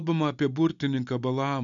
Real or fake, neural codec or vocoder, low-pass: real; none; 7.2 kHz